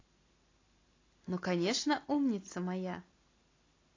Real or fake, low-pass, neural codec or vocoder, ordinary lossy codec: real; 7.2 kHz; none; AAC, 32 kbps